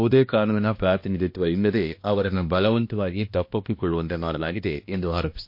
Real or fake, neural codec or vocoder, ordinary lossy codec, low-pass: fake; codec, 16 kHz, 1 kbps, X-Codec, HuBERT features, trained on balanced general audio; MP3, 32 kbps; 5.4 kHz